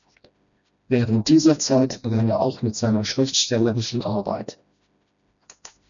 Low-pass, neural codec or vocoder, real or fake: 7.2 kHz; codec, 16 kHz, 1 kbps, FreqCodec, smaller model; fake